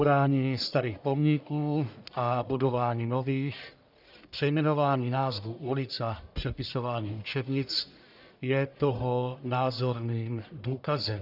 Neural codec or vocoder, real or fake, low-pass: codec, 44.1 kHz, 1.7 kbps, Pupu-Codec; fake; 5.4 kHz